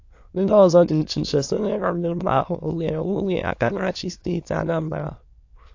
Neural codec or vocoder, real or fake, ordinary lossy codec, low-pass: autoencoder, 22.05 kHz, a latent of 192 numbers a frame, VITS, trained on many speakers; fake; AAC, 48 kbps; 7.2 kHz